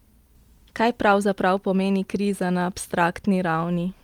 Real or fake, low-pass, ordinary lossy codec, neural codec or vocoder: real; 19.8 kHz; Opus, 32 kbps; none